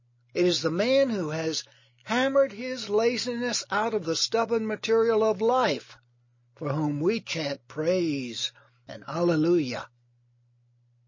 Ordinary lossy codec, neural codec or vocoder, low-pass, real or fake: MP3, 32 kbps; none; 7.2 kHz; real